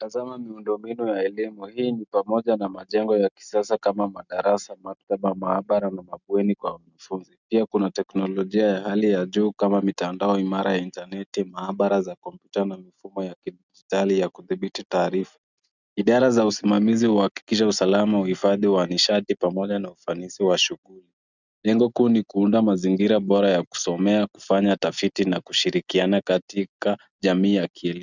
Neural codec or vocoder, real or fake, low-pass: none; real; 7.2 kHz